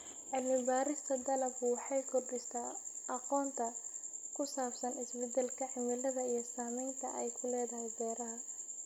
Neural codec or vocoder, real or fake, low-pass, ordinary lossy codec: none; real; 19.8 kHz; none